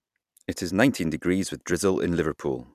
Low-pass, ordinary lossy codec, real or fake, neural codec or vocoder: 14.4 kHz; none; fake; vocoder, 44.1 kHz, 128 mel bands every 256 samples, BigVGAN v2